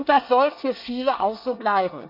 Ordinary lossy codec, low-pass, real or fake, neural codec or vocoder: none; 5.4 kHz; fake; codec, 24 kHz, 1 kbps, SNAC